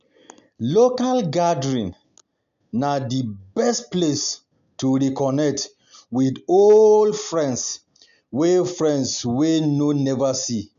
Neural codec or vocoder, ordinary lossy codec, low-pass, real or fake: none; none; 7.2 kHz; real